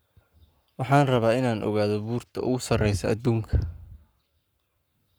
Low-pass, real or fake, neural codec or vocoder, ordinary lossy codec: none; fake; codec, 44.1 kHz, 7.8 kbps, Pupu-Codec; none